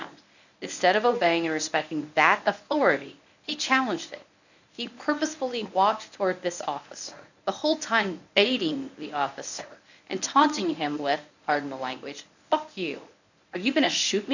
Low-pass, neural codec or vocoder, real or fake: 7.2 kHz; codec, 24 kHz, 0.9 kbps, WavTokenizer, medium speech release version 1; fake